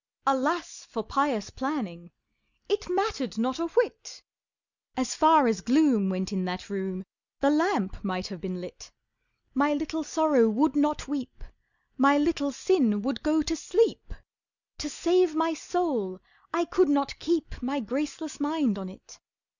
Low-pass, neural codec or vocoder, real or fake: 7.2 kHz; none; real